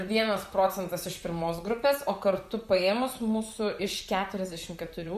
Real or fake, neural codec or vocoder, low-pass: fake; vocoder, 44.1 kHz, 128 mel bands, Pupu-Vocoder; 14.4 kHz